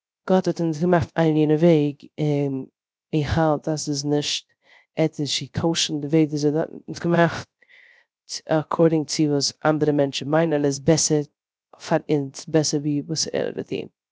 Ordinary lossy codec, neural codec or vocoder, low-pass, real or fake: none; codec, 16 kHz, 0.3 kbps, FocalCodec; none; fake